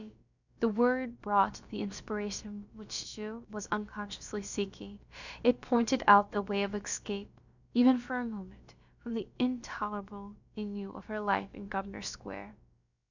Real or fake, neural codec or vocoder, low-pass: fake; codec, 16 kHz, about 1 kbps, DyCAST, with the encoder's durations; 7.2 kHz